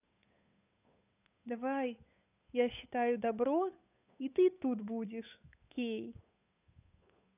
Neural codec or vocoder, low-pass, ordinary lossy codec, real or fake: codec, 16 kHz, 8 kbps, FunCodec, trained on Chinese and English, 25 frames a second; 3.6 kHz; none; fake